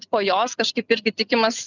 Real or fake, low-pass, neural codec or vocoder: real; 7.2 kHz; none